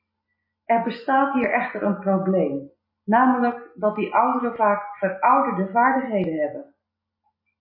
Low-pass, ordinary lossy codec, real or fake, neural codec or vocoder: 5.4 kHz; MP3, 24 kbps; real; none